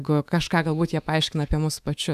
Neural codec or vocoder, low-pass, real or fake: autoencoder, 48 kHz, 128 numbers a frame, DAC-VAE, trained on Japanese speech; 14.4 kHz; fake